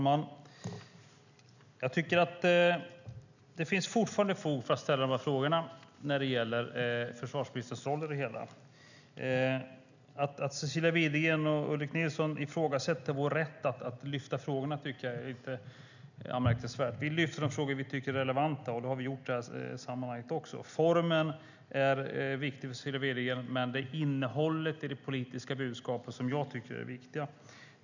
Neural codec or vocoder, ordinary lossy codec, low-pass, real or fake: none; none; 7.2 kHz; real